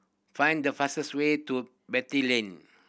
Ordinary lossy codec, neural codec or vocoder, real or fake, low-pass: none; none; real; none